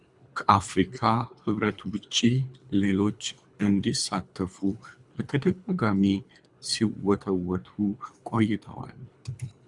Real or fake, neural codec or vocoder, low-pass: fake; codec, 24 kHz, 3 kbps, HILCodec; 10.8 kHz